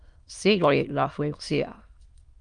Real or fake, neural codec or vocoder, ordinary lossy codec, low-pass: fake; autoencoder, 22.05 kHz, a latent of 192 numbers a frame, VITS, trained on many speakers; Opus, 32 kbps; 9.9 kHz